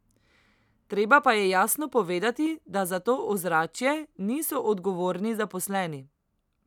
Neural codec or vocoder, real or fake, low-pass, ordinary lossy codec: none; real; 19.8 kHz; none